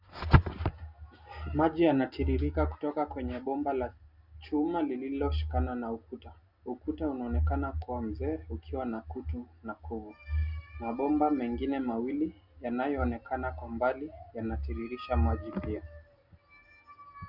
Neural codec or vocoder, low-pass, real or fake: none; 5.4 kHz; real